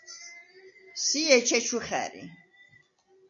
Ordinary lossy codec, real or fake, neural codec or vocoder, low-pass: MP3, 64 kbps; real; none; 7.2 kHz